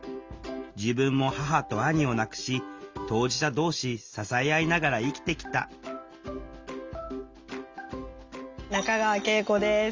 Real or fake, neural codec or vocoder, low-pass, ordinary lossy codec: real; none; 7.2 kHz; Opus, 32 kbps